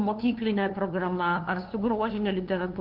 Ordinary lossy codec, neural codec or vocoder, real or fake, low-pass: Opus, 32 kbps; codec, 16 kHz, 2 kbps, FunCodec, trained on LibriTTS, 25 frames a second; fake; 5.4 kHz